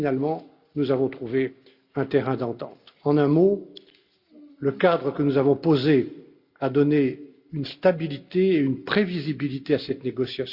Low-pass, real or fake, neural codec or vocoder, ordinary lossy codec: 5.4 kHz; real; none; Opus, 64 kbps